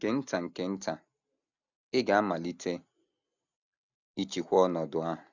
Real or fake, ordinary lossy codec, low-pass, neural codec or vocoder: real; none; 7.2 kHz; none